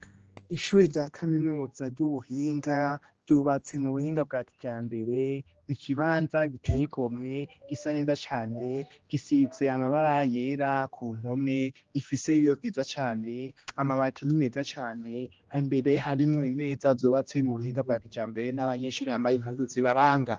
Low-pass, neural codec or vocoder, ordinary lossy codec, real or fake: 7.2 kHz; codec, 16 kHz, 1 kbps, X-Codec, HuBERT features, trained on general audio; Opus, 24 kbps; fake